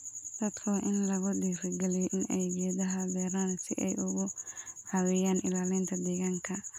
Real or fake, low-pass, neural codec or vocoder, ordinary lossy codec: real; 19.8 kHz; none; none